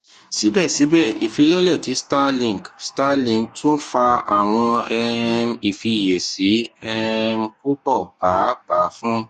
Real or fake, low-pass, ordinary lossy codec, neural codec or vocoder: fake; 14.4 kHz; Opus, 64 kbps; codec, 44.1 kHz, 2.6 kbps, DAC